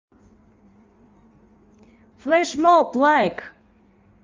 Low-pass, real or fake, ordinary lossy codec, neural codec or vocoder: 7.2 kHz; fake; Opus, 32 kbps; codec, 16 kHz in and 24 kHz out, 1.1 kbps, FireRedTTS-2 codec